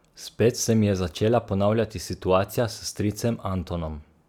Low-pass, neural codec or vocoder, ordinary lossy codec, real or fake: 19.8 kHz; none; none; real